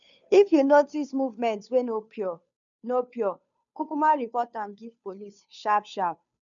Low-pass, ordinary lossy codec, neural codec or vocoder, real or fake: 7.2 kHz; none; codec, 16 kHz, 2 kbps, FunCodec, trained on Chinese and English, 25 frames a second; fake